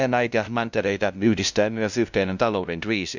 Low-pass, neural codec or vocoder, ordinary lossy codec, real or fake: 7.2 kHz; codec, 16 kHz, 0.5 kbps, FunCodec, trained on LibriTTS, 25 frames a second; none; fake